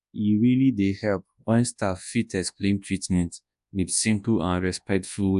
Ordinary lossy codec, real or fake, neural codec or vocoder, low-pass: AAC, 96 kbps; fake; codec, 24 kHz, 0.9 kbps, WavTokenizer, large speech release; 10.8 kHz